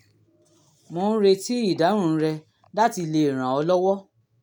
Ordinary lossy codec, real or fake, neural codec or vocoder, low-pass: none; real; none; 19.8 kHz